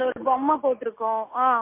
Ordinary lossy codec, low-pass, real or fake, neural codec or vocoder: MP3, 24 kbps; 3.6 kHz; real; none